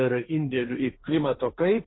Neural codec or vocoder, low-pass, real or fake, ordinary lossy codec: codec, 16 kHz, 1.1 kbps, Voila-Tokenizer; 7.2 kHz; fake; AAC, 16 kbps